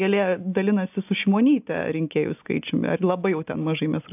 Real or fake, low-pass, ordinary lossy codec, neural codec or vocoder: real; 3.6 kHz; AAC, 32 kbps; none